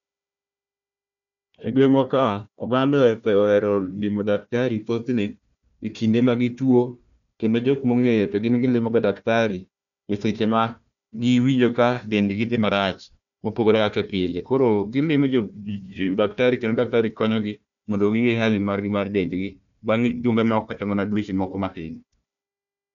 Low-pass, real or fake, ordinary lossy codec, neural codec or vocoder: 7.2 kHz; fake; none; codec, 16 kHz, 1 kbps, FunCodec, trained on Chinese and English, 50 frames a second